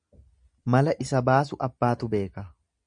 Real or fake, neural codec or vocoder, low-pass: real; none; 9.9 kHz